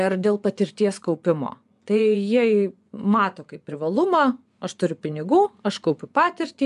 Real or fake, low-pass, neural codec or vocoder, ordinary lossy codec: fake; 10.8 kHz; vocoder, 24 kHz, 100 mel bands, Vocos; AAC, 96 kbps